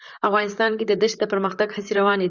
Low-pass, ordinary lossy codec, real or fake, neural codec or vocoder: 7.2 kHz; Opus, 64 kbps; fake; vocoder, 44.1 kHz, 128 mel bands, Pupu-Vocoder